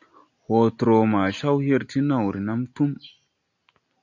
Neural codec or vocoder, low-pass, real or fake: none; 7.2 kHz; real